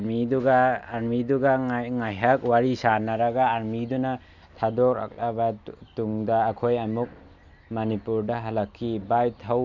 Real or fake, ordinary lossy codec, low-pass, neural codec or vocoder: real; none; 7.2 kHz; none